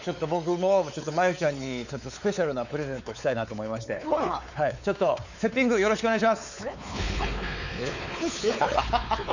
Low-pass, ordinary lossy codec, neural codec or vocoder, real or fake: 7.2 kHz; none; codec, 16 kHz, 4 kbps, X-Codec, WavLM features, trained on Multilingual LibriSpeech; fake